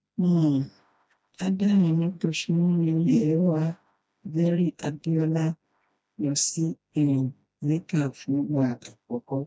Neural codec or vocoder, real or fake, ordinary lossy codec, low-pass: codec, 16 kHz, 1 kbps, FreqCodec, smaller model; fake; none; none